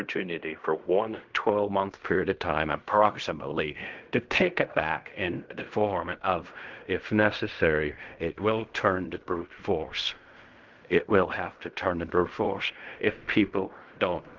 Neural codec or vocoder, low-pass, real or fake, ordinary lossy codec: codec, 16 kHz in and 24 kHz out, 0.4 kbps, LongCat-Audio-Codec, fine tuned four codebook decoder; 7.2 kHz; fake; Opus, 24 kbps